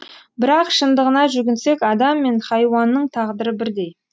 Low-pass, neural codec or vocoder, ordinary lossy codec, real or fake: none; none; none; real